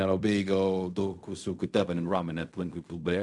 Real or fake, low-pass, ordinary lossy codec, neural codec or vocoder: fake; 10.8 kHz; AAC, 64 kbps; codec, 16 kHz in and 24 kHz out, 0.4 kbps, LongCat-Audio-Codec, fine tuned four codebook decoder